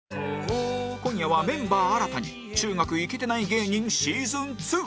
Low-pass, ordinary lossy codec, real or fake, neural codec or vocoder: none; none; real; none